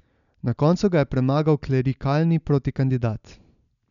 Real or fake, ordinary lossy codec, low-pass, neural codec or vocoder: real; none; 7.2 kHz; none